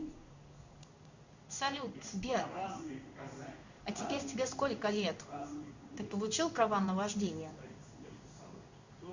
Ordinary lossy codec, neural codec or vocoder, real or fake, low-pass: Opus, 64 kbps; codec, 16 kHz in and 24 kHz out, 1 kbps, XY-Tokenizer; fake; 7.2 kHz